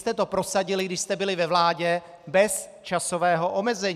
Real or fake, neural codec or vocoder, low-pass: fake; vocoder, 44.1 kHz, 128 mel bands every 256 samples, BigVGAN v2; 14.4 kHz